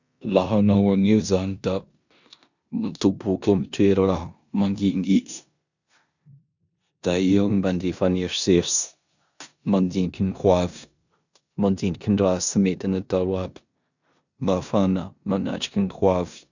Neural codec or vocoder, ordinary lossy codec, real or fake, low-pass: codec, 16 kHz in and 24 kHz out, 0.9 kbps, LongCat-Audio-Codec, four codebook decoder; none; fake; 7.2 kHz